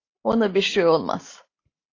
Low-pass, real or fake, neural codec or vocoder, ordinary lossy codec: 7.2 kHz; real; none; AAC, 32 kbps